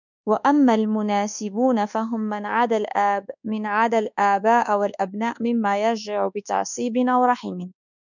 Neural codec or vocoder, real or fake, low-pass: codec, 24 kHz, 1.2 kbps, DualCodec; fake; 7.2 kHz